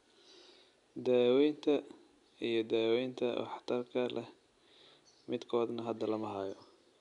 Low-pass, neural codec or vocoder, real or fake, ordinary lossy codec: 10.8 kHz; none; real; none